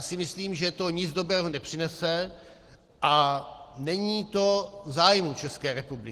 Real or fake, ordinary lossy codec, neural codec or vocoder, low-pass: real; Opus, 16 kbps; none; 14.4 kHz